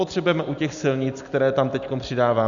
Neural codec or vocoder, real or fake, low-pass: none; real; 7.2 kHz